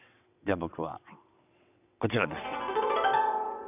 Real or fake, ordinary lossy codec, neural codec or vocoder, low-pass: fake; none; vocoder, 22.05 kHz, 80 mel bands, WaveNeXt; 3.6 kHz